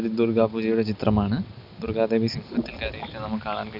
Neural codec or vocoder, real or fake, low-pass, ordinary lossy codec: none; real; 5.4 kHz; none